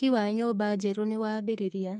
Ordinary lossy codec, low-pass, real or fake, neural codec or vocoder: none; 10.8 kHz; fake; codec, 44.1 kHz, 2.6 kbps, SNAC